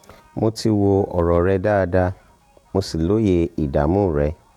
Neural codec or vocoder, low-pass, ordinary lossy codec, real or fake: vocoder, 48 kHz, 128 mel bands, Vocos; 19.8 kHz; none; fake